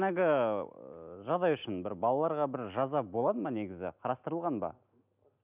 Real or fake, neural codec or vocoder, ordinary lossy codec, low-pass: real; none; none; 3.6 kHz